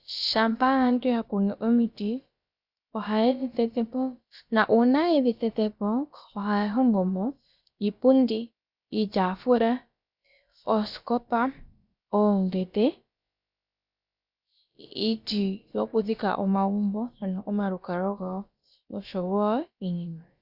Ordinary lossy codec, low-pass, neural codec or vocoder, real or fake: Opus, 64 kbps; 5.4 kHz; codec, 16 kHz, about 1 kbps, DyCAST, with the encoder's durations; fake